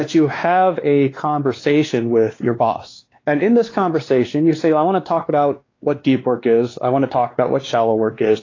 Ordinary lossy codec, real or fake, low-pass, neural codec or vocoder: AAC, 32 kbps; fake; 7.2 kHz; codec, 16 kHz, 2 kbps, X-Codec, WavLM features, trained on Multilingual LibriSpeech